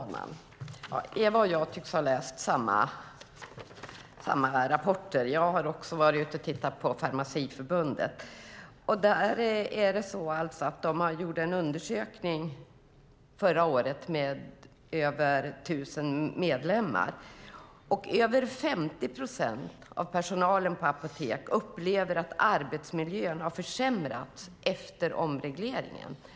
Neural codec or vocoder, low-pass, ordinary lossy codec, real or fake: none; none; none; real